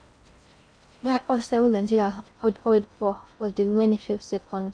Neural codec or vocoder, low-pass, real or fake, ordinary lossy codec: codec, 16 kHz in and 24 kHz out, 0.6 kbps, FocalCodec, streaming, 4096 codes; 9.9 kHz; fake; none